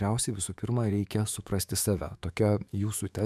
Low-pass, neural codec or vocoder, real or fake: 14.4 kHz; autoencoder, 48 kHz, 128 numbers a frame, DAC-VAE, trained on Japanese speech; fake